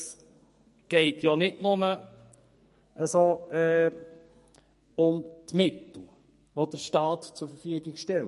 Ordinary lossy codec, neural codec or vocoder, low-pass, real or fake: MP3, 48 kbps; codec, 44.1 kHz, 2.6 kbps, SNAC; 14.4 kHz; fake